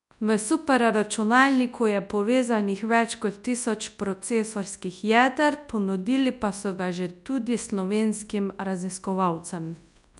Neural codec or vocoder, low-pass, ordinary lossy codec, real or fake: codec, 24 kHz, 0.9 kbps, WavTokenizer, large speech release; 10.8 kHz; none; fake